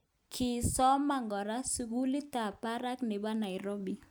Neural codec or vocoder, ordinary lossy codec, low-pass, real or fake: none; none; none; real